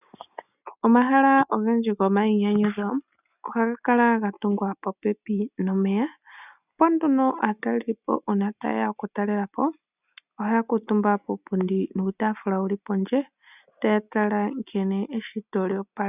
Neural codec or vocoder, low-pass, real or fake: none; 3.6 kHz; real